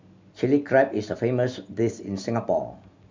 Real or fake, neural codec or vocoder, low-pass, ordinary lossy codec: real; none; 7.2 kHz; MP3, 64 kbps